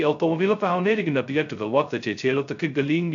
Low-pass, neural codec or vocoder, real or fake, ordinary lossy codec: 7.2 kHz; codec, 16 kHz, 0.2 kbps, FocalCodec; fake; AAC, 64 kbps